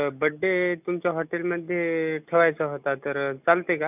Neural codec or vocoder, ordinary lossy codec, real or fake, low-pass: none; none; real; 3.6 kHz